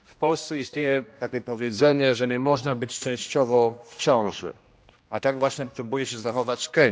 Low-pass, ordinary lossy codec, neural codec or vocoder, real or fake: none; none; codec, 16 kHz, 1 kbps, X-Codec, HuBERT features, trained on general audio; fake